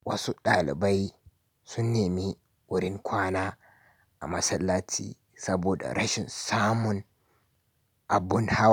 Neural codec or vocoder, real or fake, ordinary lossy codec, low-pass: none; real; none; none